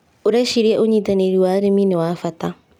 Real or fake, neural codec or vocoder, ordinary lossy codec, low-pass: real; none; none; 19.8 kHz